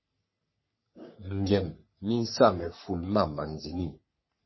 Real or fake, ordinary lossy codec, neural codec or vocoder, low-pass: fake; MP3, 24 kbps; codec, 44.1 kHz, 3.4 kbps, Pupu-Codec; 7.2 kHz